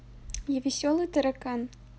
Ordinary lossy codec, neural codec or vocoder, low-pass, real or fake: none; none; none; real